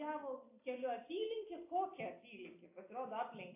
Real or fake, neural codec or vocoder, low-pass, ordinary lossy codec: real; none; 3.6 kHz; AAC, 24 kbps